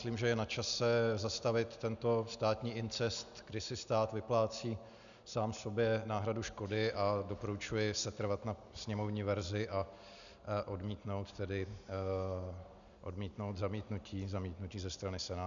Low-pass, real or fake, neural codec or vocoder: 7.2 kHz; real; none